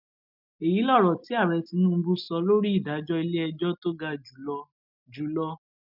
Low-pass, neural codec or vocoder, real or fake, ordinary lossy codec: 5.4 kHz; none; real; none